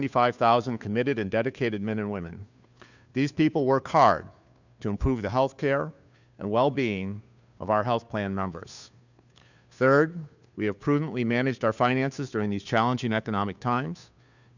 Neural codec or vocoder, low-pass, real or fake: codec, 16 kHz, 2 kbps, FunCodec, trained on Chinese and English, 25 frames a second; 7.2 kHz; fake